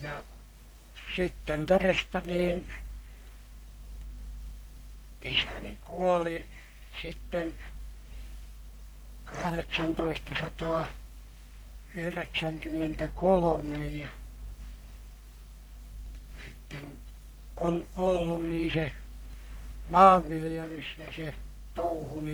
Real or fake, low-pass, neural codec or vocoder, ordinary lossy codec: fake; none; codec, 44.1 kHz, 1.7 kbps, Pupu-Codec; none